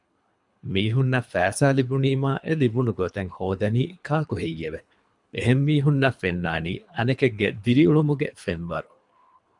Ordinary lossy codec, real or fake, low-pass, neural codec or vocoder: MP3, 96 kbps; fake; 10.8 kHz; codec, 24 kHz, 3 kbps, HILCodec